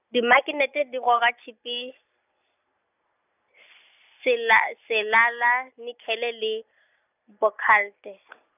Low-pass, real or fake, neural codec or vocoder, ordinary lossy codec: 3.6 kHz; real; none; none